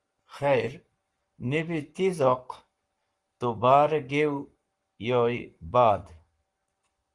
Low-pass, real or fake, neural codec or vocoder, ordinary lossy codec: 10.8 kHz; fake; codec, 44.1 kHz, 7.8 kbps, Pupu-Codec; Opus, 24 kbps